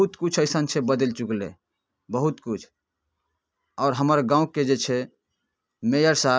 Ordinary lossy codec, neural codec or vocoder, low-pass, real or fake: none; none; none; real